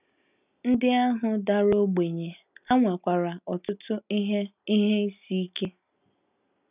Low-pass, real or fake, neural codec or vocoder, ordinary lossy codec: 3.6 kHz; real; none; none